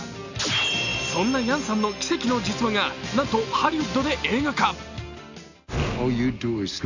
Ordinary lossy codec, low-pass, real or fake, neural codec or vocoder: none; 7.2 kHz; real; none